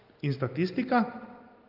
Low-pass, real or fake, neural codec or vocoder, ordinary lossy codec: 5.4 kHz; real; none; Opus, 32 kbps